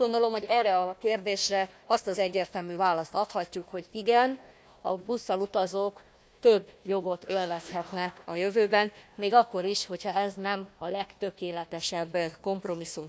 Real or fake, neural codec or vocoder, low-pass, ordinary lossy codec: fake; codec, 16 kHz, 1 kbps, FunCodec, trained on Chinese and English, 50 frames a second; none; none